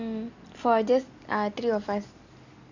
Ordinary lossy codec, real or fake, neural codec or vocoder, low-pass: none; real; none; 7.2 kHz